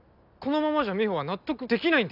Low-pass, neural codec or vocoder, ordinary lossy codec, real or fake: 5.4 kHz; none; none; real